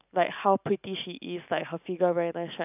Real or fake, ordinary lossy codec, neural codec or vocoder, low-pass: real; none; none; 3.6 kHz